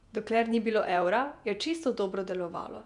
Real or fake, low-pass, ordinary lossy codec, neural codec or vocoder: fake; 10.8 kHz; none; vocoder, 48 kHz, 128 mel bands, Vocos